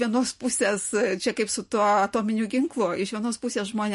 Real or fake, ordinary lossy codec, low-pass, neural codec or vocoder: real; MP3, 48 kbps; 14.4 kHz; none